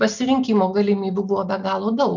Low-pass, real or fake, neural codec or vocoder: 7.2 kHz; real; none